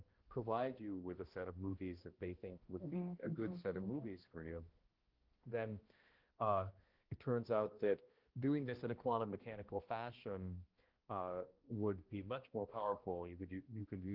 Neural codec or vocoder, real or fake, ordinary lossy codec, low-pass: codec, 16 kHz, 1 kbps, X-Codec, HuBERT features, trained on balanced general audio; fake; Opus, 16 kbps; 5.4 kHz